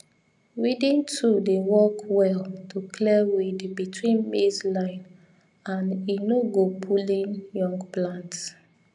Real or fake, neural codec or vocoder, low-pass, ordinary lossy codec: real; none; 10.8 kHz; none